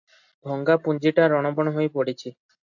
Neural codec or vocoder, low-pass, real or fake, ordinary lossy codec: none; 7.2 kHz; real; AAC, 48 kbps